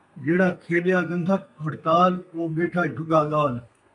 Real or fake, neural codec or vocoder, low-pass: fake; codec, 32 kHz, 1.9 kbps, SNAC; 10.8 kHz